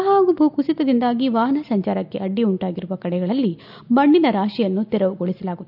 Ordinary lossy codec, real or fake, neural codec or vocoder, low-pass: none; real; none; 5.4 kHz